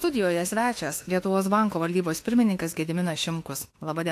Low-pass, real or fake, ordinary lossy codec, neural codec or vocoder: 14.4 kHz; fake; AAC, 64 kbps; autoencoder, 48 kHz, 32 numbers a frame, DAC-VAE, trained on Japanese speech